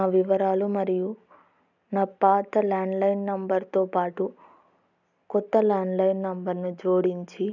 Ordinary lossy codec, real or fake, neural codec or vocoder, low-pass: none; real; none; 7.2 kHz